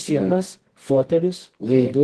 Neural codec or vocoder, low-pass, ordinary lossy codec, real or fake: codec, 24 kHz, 0.9 kbps, WavTokenizer, medium music audio release; 10.8 kHz; Opus, 16 kbps; fake